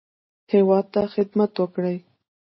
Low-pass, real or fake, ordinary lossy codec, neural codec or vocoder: 7.2 kHz; real; MP3, 24 kbps; none